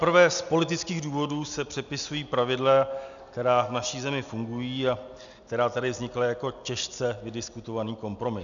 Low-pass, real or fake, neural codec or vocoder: 7.2 kHz; real; none